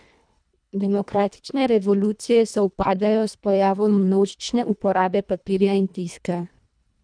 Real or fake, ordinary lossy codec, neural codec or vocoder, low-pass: fake; none; codec, 24 kHz, 1.5 kbps, HILCodec; 9.9 kHz